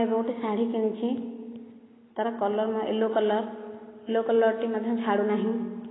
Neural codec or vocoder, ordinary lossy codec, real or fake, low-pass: none; AAC, 16 kbps; real; 7.2 kHz